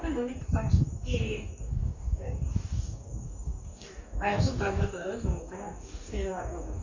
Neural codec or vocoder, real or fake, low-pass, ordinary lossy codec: codec, 44.1 kHz, 2.6 kbps, DAC; fake; 7.2 kHz; none